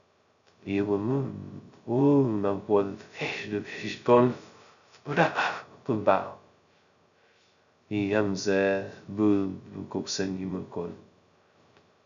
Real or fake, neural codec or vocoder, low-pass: fake; codec, 16 kHz, 0.2 kbps, FocalCodec; 7.2 kHz